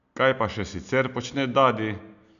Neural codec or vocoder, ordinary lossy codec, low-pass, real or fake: none; none; 7.2 kHz; real